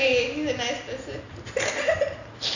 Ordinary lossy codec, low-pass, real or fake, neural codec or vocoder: none; 7.2 kHz; real; none